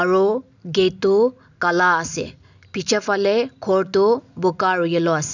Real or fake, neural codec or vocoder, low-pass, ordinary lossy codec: real; none; 7.2 kHz; none